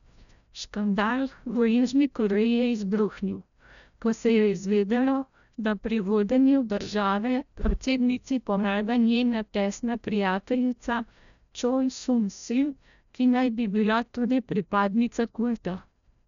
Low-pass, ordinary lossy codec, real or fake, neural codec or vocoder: 7.2 kHz; none; fake; codec, 16 kHz, 0.5 kbps, FreqCodec, larger model